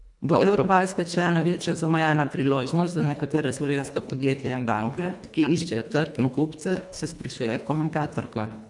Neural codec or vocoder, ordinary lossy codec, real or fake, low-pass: codec, 24 kHz, 1.5 kbps, HILCodec; none; fake; 10.8 kHz